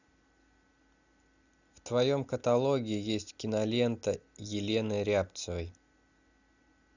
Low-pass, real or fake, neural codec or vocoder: 7.2 kHz; real; none